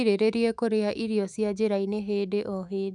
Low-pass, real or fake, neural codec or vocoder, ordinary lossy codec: 10.8 kHz; fake; autoencoder, 48 kHz, 128 numbers a frame, DAC-VAE, trained on Japanese speech; none